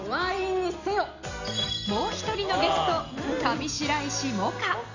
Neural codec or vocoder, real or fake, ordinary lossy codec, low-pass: none; real; none; 7.2 kHz